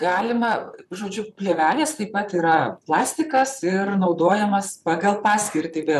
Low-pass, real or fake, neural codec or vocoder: 14.4 kHz; fake; vocoder, 44.1 kHz, 128 mel bands, Pupu-Vocoder